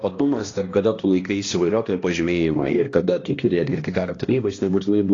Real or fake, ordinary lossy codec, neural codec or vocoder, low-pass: fake; AAC, 32 kbps; codec, 16 kHz, 1 kbps, X-Codec, HuBERT features, trained on balanced general audio; 7.2 kHz